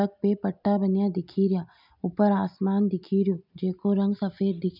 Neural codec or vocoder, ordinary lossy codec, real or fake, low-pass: none; none; real; 5.4 kHz